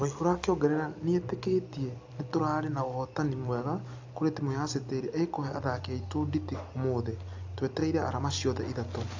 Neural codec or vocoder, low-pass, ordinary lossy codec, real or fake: none; 7.2 kHz; AAC, 48 kbps; real